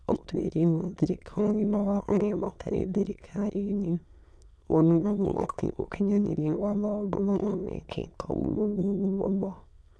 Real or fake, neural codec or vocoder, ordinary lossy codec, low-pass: fake; autoencoder, 22.05 kHz, a latent of 192 numbers a frame, VITS, trained on many speakers; none; none